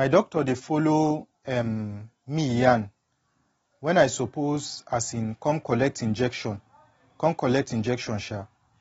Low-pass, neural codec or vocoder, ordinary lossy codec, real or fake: 10.8 kHz; none; AAC, 24 kbps; real